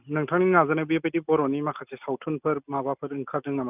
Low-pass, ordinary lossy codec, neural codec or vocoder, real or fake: 3.6 kHz; none; none; real